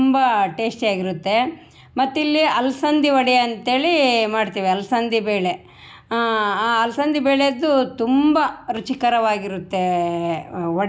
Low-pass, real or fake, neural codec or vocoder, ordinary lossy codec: none; real; none; none